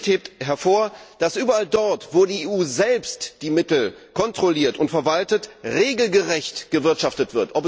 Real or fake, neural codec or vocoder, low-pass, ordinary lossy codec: real; none; none; none